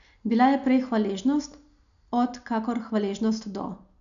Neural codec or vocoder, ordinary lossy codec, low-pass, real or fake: none; none; 7.2 kHz; real